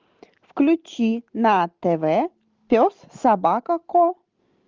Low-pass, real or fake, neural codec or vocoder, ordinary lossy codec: 7.2 kHz; real; none; Opus, 32 kbps